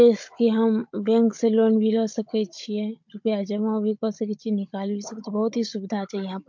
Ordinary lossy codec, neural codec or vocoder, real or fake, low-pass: MP3, 48 kbps; codec, 16 kHz, 16 kbps, FunCodec, trained on Chinese and English, 50 frames a second; fake; 7.2 kHz